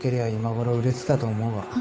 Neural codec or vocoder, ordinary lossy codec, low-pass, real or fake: codec, 16 kHz, 8 kbps, FunCodec, trained on Chinese and English, 25 frames a second; none; none; fake